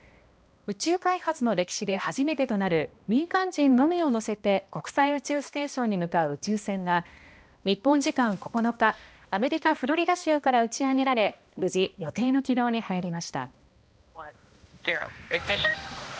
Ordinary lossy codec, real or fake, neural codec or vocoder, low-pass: none; fake; codec, 16 kHz, 1 kbps, X-Codec, HuBERT features, trained on balanced general audio; none